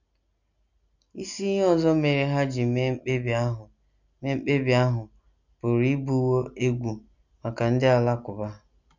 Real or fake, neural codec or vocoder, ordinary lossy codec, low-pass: real; none; none; 7.2 kHz